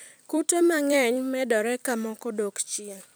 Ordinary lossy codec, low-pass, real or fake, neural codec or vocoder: none; none; fake; vocoder, 44.1 kHz, 128 mel bands every 256 samples, BigVGAN v2